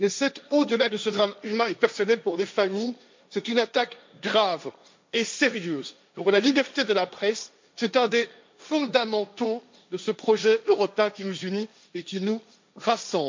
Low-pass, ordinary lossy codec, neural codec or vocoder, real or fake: 7.2 kHz; MP3, 64 kbps; codec, 16 kHz, 1.1 kbps, Voila-Tokenizer; fake